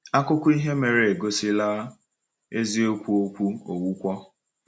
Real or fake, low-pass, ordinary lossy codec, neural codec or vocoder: real; none; none; none